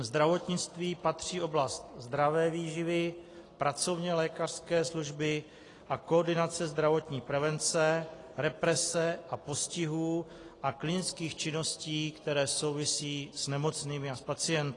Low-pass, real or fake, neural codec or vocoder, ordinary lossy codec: 10.8 kHz; real; none; AAC, 32 kbps